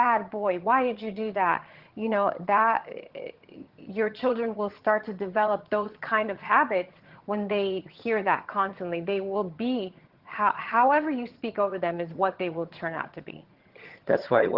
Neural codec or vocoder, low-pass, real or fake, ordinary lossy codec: vocoder, 22.05 kHz, 80 mel bands, HiFi-GAN; 5.4 kHz; fake; Opus, 16 kbps